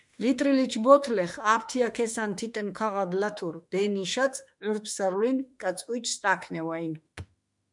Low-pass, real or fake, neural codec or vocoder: 10.8 kHz; fake; autoencoder, 48 kHz, 32 numbers a frame, DAC-VAE, trained on Japanese speech